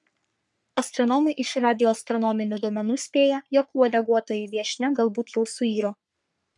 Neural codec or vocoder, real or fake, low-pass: codec, 44.1 kHz, 3.4 kbps, Pupu-Codec; fake; 10.8 kHz